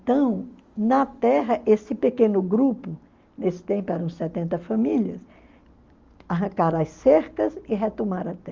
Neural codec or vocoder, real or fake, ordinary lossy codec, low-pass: none; real; Opus, 32 kbps; 7.2 kHz